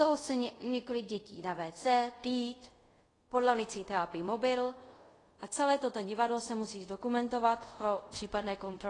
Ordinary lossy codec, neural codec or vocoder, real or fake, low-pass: AAC, 32 kbps; codec, 24 kHz, 0.5 kbps, DualCodec; fake; 10.8 kHz